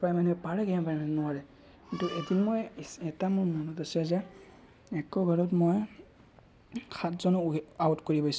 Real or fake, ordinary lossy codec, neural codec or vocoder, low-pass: real; none; none; none